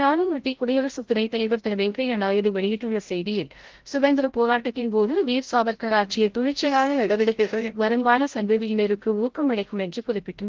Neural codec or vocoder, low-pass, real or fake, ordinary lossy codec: codec, 16 kHz, 0.5 kbps, FreqCodec, larger model; 7.2 kHz; fake; Opus, 16 kbps